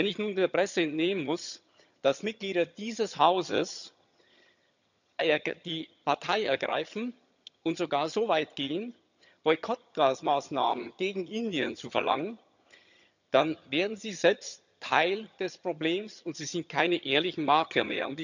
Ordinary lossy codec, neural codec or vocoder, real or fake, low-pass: none; vocoder, 22.05 kHz, 80 mel bands, HiFi-GAN; fake; 7.2 kHz